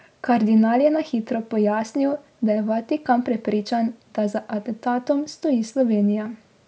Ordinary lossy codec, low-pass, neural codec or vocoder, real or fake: none; none; none; real